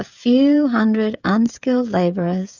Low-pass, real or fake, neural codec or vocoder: 7.2 kHz; fake; codec, 16 kHz, 16 kbps, FreqCodec, smaller model